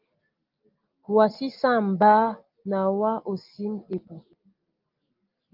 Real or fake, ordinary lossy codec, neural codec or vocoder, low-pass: real; Opus, 24 kbps; none; 5.4 kHz